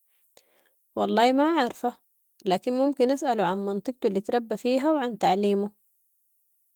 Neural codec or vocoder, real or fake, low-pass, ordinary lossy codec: autoencoder, 48 kHz, 128 numbers a frame, DAC-VAE, trained on Japanese speech; fake; 19.8 kHz; Opus, 24 kbps